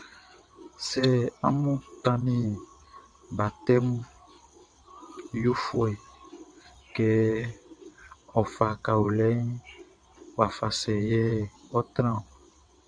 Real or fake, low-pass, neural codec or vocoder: fake; 9.9 kHz; vocoder, 22.05 kHz, 80 mel bands, WaveNeXt